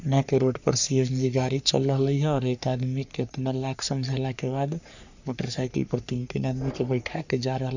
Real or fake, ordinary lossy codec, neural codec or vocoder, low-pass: fake; none; codec, 44.1 kHz, 3.4 kbps, Pupu-Codec; 7.2 kHz